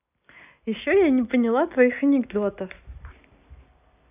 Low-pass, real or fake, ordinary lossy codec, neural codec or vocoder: 3.6 kHz; real; none; none